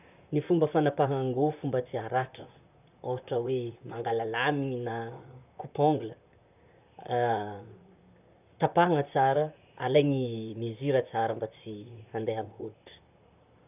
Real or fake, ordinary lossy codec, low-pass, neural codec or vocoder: fake; none; 3.6 kHz; vocoder, 44.1 kHz, 128 mel bands, Pupu-Vocoder